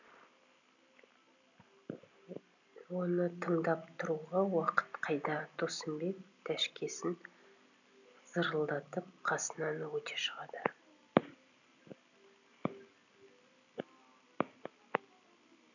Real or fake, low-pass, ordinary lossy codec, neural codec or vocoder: real; 7.2 kHz; none; none